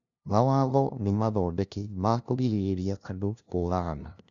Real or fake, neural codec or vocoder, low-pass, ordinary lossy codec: fake; codec, 16 kHz, 0.5 kbps, FunCodec, trained on LibriTTS, 25 frames a second; 7.2 kHz; none